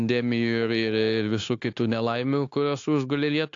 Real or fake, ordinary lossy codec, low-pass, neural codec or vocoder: fake; AAC, 48 kbps; 7.2 kHz; codec, 16 kHz, 0.9 kbps, LongCat-Audio-Codec